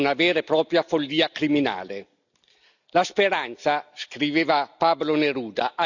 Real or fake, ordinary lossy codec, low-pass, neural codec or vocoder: real; none; 7.2 kHz; none